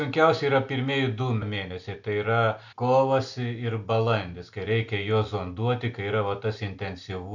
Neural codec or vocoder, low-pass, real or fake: none; 7.2 kHz; real